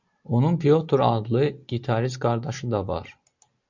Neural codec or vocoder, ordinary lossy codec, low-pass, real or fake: none; MP3, 64 kbps; 7.2 kHz; real